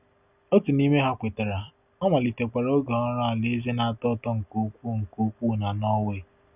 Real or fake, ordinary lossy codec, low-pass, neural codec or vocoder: real; none; 3.6 kHz; none